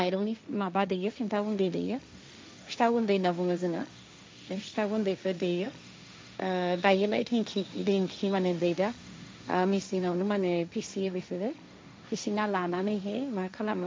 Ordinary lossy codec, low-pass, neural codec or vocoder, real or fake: none; none; codec, 16 kHz, 1.1 kbps, Voila-Tokenizer; fake